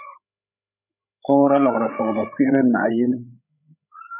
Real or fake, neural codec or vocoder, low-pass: fake; codec, 16 kHz, 16 kbps, FreqCodec, larger model; 3.6 kHz